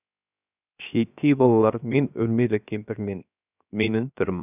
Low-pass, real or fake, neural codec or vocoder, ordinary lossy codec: 3.6 kHz; fake; codec, 16 kHz, 0.7 kbps, FocalCodec; none